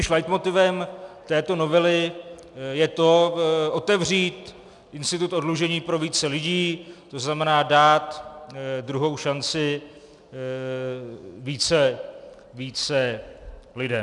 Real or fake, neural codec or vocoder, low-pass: real; none; 10.8 kHz